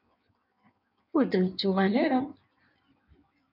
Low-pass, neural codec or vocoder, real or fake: 5.4 kHz; codec, 16 kHz in and 24 kHz out, 1.1 kbps, FireRedTTS-2 codec; fake